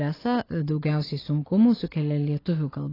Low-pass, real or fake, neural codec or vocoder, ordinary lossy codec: 5.4 kHz; real; none; AAC, 24 kbps